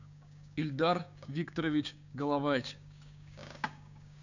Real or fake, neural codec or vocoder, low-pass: fake; codec, 16 kHz, 6 kbps, DAC; 7.2 kHz